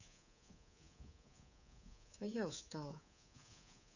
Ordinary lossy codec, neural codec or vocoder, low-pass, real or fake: none; codec, 24 kHz, 3.1 kbps, DualCodec; 7.2 kHz; fake